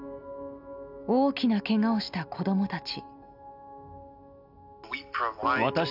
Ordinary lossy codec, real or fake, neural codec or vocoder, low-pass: none; real; none; 5.4 kHz